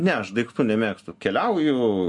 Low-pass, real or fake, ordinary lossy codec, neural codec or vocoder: 10.8 kHz; real; MP3, 48 kbps; none